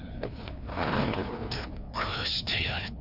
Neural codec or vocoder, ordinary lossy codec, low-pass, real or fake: codec, 16 kHz, 2 kbps, FreqCodec, larger model; Opus, 64 kbps; 5.4 kHz; fake